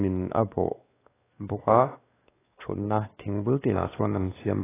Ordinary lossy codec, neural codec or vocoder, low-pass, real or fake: AAC, 16 kbps; codec, 16 kHz, 2 kbps, X-Codec, WavLM features, trained on Multilingual LibriSpeech; 3.6 kHz; fake